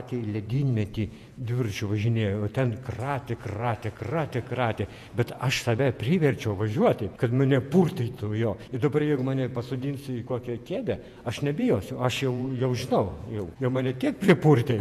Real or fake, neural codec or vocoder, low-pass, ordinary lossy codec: fake; vocoder, 48 kHz, 128 mel bands, Vocos; 14.4 kHz; MP3, 96 kbps